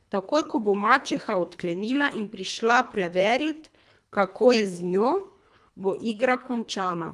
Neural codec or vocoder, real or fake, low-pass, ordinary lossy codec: codec, 24 kHz, 1.5 kbps, HILCodec; fake; none; none